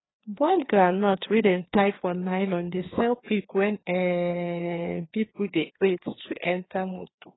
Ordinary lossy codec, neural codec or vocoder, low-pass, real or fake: AAC, 16 kbps; codec, 16 kHz, 2 kbps, FreqCodec, larger model; 7.2 kHz; fake